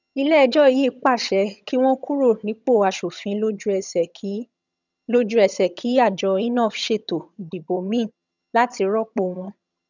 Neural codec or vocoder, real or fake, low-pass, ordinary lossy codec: vocoder, 22.05 kHz, 80 mel bands, HiFi-GAN; fake; 7.2 kHz; none